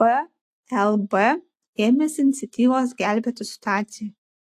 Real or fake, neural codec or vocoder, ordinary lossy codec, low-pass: real; none; AAC, 64 kbps; 14.4 kHz